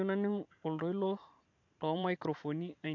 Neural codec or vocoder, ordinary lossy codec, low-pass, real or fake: vocoder, 44.1 kHz, 128 mel bands every 512 samples, BigVGAN v2; AAC, 48 kbps; 7.2 kHz; fake